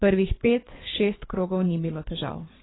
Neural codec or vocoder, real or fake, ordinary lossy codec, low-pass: codec, 24 kHz, 3 kbps, HILCodec; fake; AAC, 16 kbps; 7.2 kHz